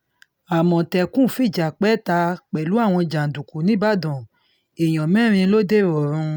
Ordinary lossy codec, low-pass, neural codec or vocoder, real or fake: none; none; none; real